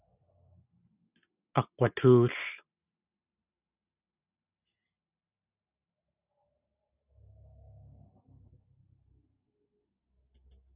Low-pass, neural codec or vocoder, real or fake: 3.6 kHz; none; real